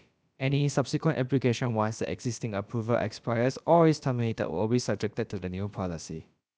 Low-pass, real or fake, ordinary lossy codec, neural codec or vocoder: none; fake; none; codec, 16 kHz, about 1 kbps, DyCAST, with the encoder's durations